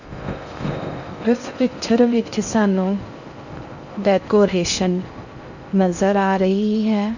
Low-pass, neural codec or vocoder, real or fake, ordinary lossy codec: 7.2 kHz; codec, 16 kHz in and 24 kHz out, 0.6 kbps, FocalCodec, streaming, 4096 codes; fake; none